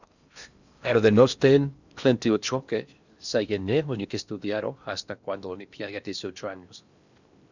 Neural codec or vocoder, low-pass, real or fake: codec, 16 kHz in and 24 kHz out, 0.6 kbps, FocalCodec, streaming, 4096 codes; 7.2 kHz; fake